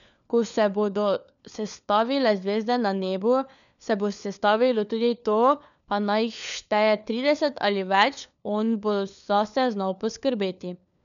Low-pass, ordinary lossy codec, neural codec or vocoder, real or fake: 7.2 kHz; none; codec, 16 kHz, 4 kbps, FunCodec, trained on LibriTTS, 50 frames a second; fake